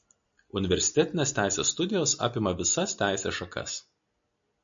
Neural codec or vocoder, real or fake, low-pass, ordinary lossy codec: none; real; 7.2 kHz; MP3, 64 kbps